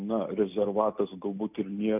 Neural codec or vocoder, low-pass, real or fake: none; 3.6 kHz; real